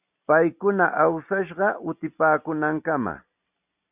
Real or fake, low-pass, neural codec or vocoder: real; 3.6 kHz; none